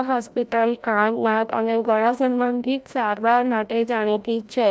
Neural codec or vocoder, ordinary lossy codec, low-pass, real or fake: codec, 16 kHz, 0.5 kbps, FreqCodec, larger model; none; none; fake